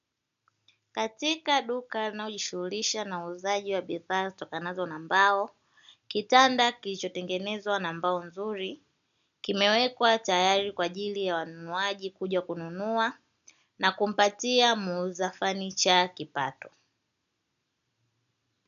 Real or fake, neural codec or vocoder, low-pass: real; none; 7.2 kHz